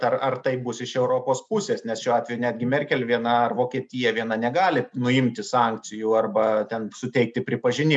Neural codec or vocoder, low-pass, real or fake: none; 9.9 kHz; real